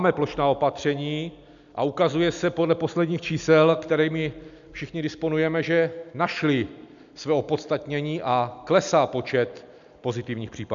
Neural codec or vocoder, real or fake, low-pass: none; real; 7.2 kHz